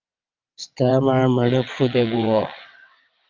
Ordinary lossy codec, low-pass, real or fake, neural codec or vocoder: Opus, 24 kbps; 7.2 kHz; fake; vocoder, 22.05 kHz, 80 mel bands, WaveNeXt